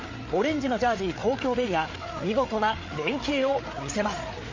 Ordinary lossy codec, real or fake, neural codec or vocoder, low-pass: MP3, 32 kbps; fake; codec, 16 kHz, 8 kbps, FreqCodec, larger model; 7.2 kHz